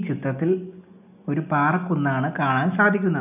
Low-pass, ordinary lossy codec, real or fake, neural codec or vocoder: 3.6 kHz; none; real; none